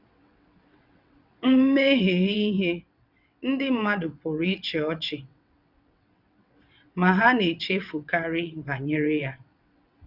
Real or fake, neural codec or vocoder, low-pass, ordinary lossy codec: fake; vocoder, 22.05 kHz, 80 mel bands, WaveNeXt; 5.4 kHz; none